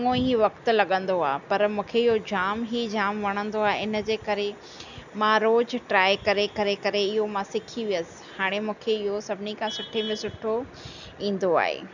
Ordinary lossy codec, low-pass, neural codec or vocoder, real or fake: none; 7.2 kHz; none; real